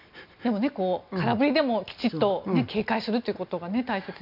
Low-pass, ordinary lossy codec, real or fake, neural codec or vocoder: 5.4 kHz; none; real; none